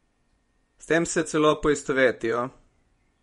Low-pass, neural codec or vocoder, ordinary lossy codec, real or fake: 10.8 kHz; vocoder, 24 kHz, 100 mel bands, Vocos; MP3, 48 kbps; fake